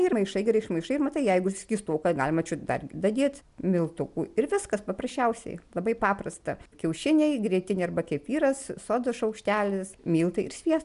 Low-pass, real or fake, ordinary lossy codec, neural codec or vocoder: 10.8 kHz; real; MP3, 96 kbps; none